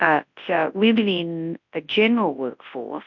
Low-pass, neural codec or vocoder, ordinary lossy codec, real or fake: 7.2 kHz; codec, 24 kHz, 0.9 kbps, WavTokenizer, large speech release; MP3, 48 kbps; fake